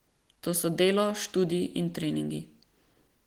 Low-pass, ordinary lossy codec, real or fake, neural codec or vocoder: 19.8 kHz; Opus, 16 kbps; real; none